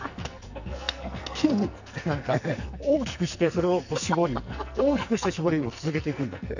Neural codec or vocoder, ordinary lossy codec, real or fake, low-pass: codec, 44.1 kHz, 2.6 kbps, SNAC; none; fake; 7.2 kHz